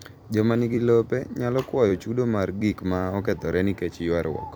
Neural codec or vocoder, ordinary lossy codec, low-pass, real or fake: vocoder, 44.1 kHz, 128 mel bands every 256 samples, BigVGAN v2; none; none; fake